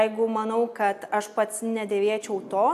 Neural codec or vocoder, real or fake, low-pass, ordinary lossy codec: none; real; 14.4 kHz; AAC, 96 kbps